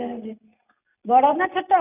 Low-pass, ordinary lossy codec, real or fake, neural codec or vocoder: 3.6 kHz; none; real; none